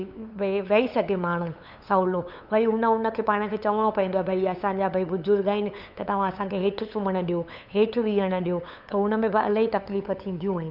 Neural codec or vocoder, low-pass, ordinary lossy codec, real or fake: codec, 16 kHz, 8 kbps, FunCodec, trained on LibriTTS, 25 frames a second; 5.4 kHz; none; fake